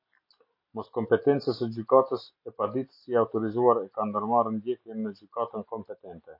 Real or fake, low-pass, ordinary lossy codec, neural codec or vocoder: real; 5.4 kHz; MP3, 32 kbps; none